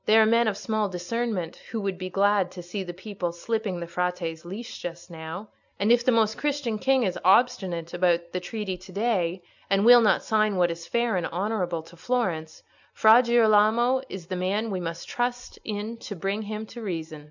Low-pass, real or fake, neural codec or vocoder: 7.2 kHz; real; none